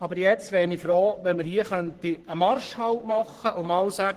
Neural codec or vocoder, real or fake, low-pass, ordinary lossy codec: codec, 44.1 kHz, 3.4 kbps, Pupu-Codec; fake; 14.4 kHz; Opus, 16 kbps